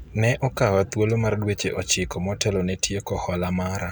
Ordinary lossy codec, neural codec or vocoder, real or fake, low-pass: none; none; real; none